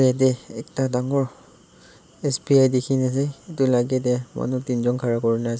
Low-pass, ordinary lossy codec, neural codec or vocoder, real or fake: none; none; none; real